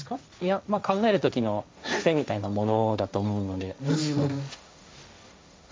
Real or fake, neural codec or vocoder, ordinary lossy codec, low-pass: fake; codec, 16 kHz, 1.1 kbps, Voila-Tokenizer; none; none